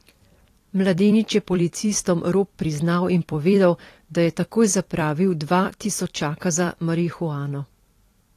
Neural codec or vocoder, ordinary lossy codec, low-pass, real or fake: vocoder, 44.1 kHz, 128 mel bands every 256 samples, BigVGAN v2; AAC, 48 kbps; 14.4 kHz; fake